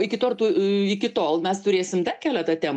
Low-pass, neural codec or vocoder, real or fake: 10.8 kHz; none; real